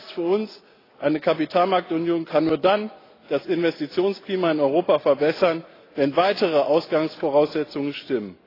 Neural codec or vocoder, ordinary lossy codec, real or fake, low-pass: none; AAC, 24 kbps; real; 5.4 kHz